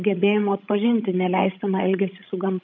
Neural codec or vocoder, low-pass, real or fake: codec, 16 kHz, 16 kbps, FreqCodec, larger model; 7.2 kHz; fake